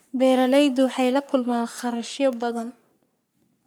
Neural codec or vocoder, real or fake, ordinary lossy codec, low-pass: codec, 44.1 kHz, 3.4 kbps, Pupu-Codec; fake; none; none